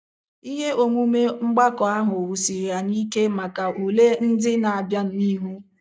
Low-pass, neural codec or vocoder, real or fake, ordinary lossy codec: none; none; real; none